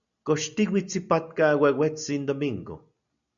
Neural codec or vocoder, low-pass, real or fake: none; 7.2 kHz; real